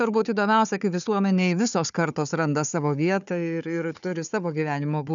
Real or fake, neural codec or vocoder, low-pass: fake; codec, 16 kHz, 4 kbps, FunCodec, trained on Chinese and English, 50 frames a second; 7.2 kHz